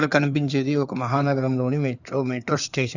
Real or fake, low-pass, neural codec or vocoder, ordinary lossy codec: fake; 7.2 kHz; codec, 16 kHz in and 24 kHz out, 2.2 kbps, FireRedTTS-2 codec; none